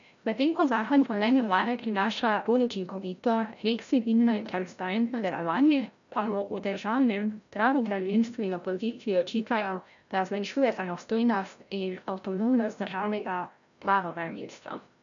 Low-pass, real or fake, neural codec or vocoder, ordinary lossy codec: 7.2 kHz; fake; codec, 16 kHz, 0.5 kbps, FreqCodec, larger model; none